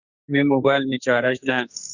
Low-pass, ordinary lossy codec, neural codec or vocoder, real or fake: 7.2 kHz; Opus, 64 kbps; codec, 44.1 kHz, 2.6 kbps, SNAC; fake